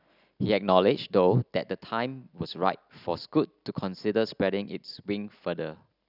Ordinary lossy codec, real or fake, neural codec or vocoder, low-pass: none; real; none; 5.4 kHz